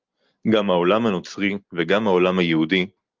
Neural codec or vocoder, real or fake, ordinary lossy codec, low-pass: none; real; Opus, 32 kbps; 7.2 kHz